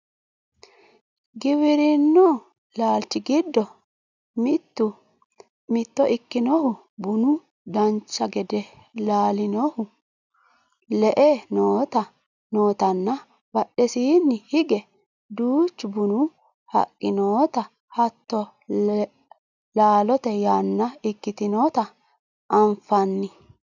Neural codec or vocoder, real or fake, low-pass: none; real; 7.2 kHz